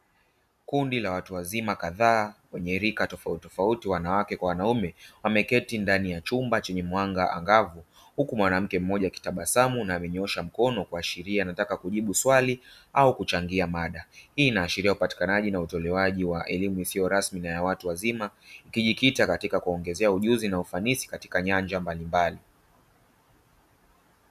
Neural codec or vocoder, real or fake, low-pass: none; real; 14.4 kHz